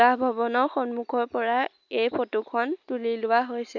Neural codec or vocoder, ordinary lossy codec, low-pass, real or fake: none; none; 7.2 kHz; real